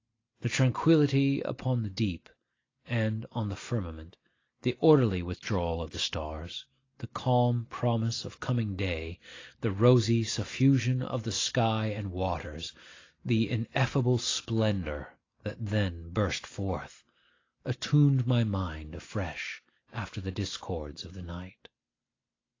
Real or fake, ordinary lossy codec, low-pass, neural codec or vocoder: real; AAC, 32 kbps; 7.2 kHz; none